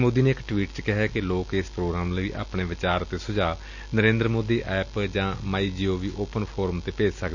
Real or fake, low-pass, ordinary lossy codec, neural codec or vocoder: real; 7.2 kHz; none; none